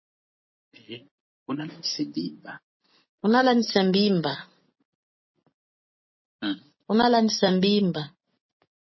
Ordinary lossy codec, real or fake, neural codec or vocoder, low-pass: MP3, 24 kbps; real; none; 7.2 kHz